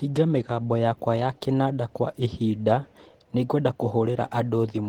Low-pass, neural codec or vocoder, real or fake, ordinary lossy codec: 19.8 kHz; none; real; Opus, 16 kbps